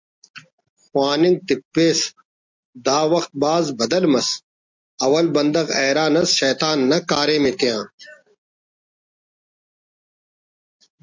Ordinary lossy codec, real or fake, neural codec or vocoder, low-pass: MP3, 64 kbps; real; none; 7.2 kHz